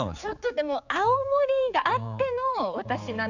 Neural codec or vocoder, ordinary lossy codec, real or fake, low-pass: codec, 16 kHz, 4 kbps, X-Codec, HuBERT features, trained on general audio; none; fake; 7.2 kHz